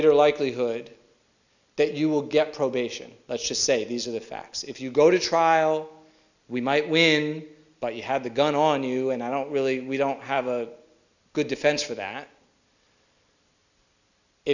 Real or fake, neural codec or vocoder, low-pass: real; none; 7.2 kHz